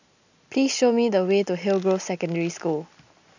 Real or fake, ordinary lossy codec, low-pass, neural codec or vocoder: real; none; 7.2 kHz; none